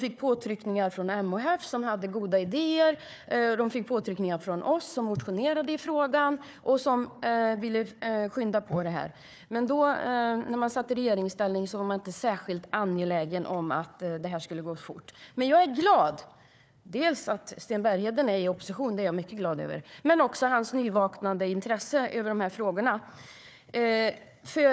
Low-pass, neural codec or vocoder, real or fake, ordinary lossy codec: none; codec, 16 kHz, 4 kbps, FunCodec, trained on Chinese and English, 50 frames a second; fake; none